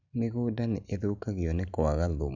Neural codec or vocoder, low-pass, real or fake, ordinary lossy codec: none; 7.2 kHz; real; none